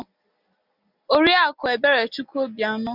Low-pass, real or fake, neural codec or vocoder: 5.4 kHz; real; none